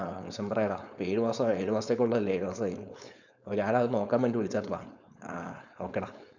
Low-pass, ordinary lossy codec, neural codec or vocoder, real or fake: 7.2 kHz; none; codec, 16 kHz, 4.8 kbps, FACodec; fake